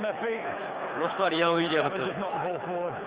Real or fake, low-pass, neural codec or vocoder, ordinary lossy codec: fake; 3.6 kHz; codec, 24 kHz, 6 kbps, HILCodec; Opus, 24 kbps